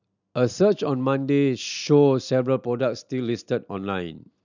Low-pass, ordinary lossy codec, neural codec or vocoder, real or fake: 7.2 kHz; none; none; real